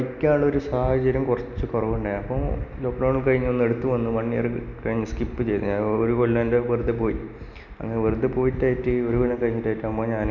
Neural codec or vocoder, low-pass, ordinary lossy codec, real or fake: none; 7.2 kHz; none; real